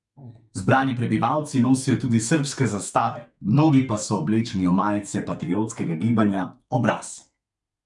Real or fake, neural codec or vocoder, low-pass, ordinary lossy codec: fake; codec, 32 kHz, 1.9 kbps, SNAC; 10.8 kHz; none